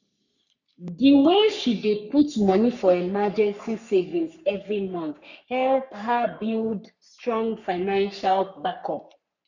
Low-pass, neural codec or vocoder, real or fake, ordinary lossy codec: 7.2 kHz; codec, 44.1 kHz, 3.4 kbps, Pupu-Codec; fake; none